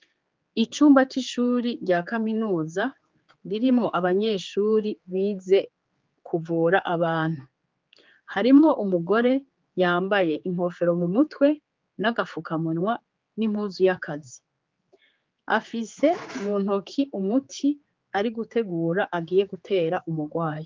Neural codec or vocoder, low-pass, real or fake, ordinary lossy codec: codec, 16 kHz, 4 kbps, X-Codec, HuBERT features, trained on general audio; 7.2 kHz; fake; Opus, 32 kbps